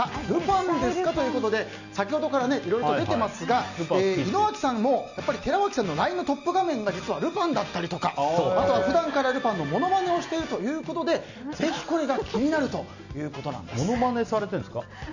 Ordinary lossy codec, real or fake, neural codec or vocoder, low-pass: none; real; none; 7.2 kHz